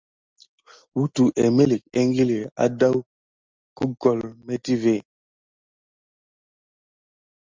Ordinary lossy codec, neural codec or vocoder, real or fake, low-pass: Opus, 32 kbps; none; real; 7.2 kHz